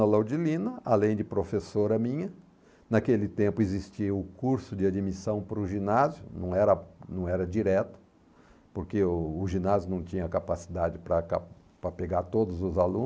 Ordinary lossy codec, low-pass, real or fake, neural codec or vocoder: none; none; real; none